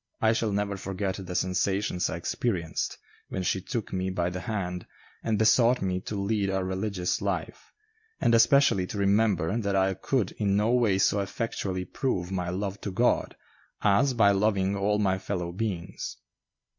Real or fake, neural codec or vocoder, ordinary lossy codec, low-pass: real; none; MP3, 64 kbps; 7.2 kHz